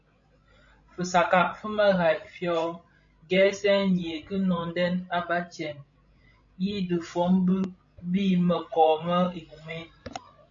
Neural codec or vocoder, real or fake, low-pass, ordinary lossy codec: codec, 16 kHz, 16 kbps, FreqCodec, larger model; fake; 7.2 kHz; AAC, 64 kbps